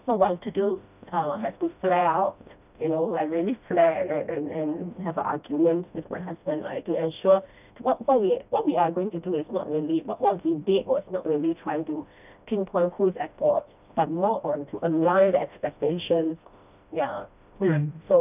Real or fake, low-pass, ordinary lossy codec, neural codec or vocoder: fake; 3.6 kHz; none; codec, 16 kHz, 1 kbps, FreqCodec, smaller model